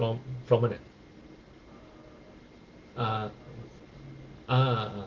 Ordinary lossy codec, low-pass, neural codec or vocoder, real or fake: Opus, 16 kbps; 7.2 kHz; none; real